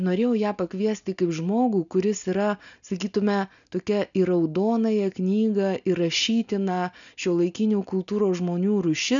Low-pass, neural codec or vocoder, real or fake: 7.2 kHz; none; real